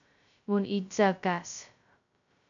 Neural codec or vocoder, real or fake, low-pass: codec, 16 kHz, 0.2 kbps, FocalCodec; fake; 7.2 kHz